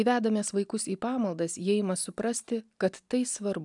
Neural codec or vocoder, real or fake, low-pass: none; real; 10.8 kHz